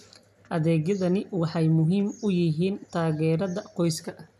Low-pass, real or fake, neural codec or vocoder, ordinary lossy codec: 14.4 kHz; real; none; none